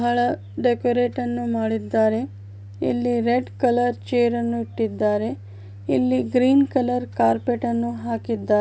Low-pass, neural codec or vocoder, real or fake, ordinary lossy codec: none; none; real; none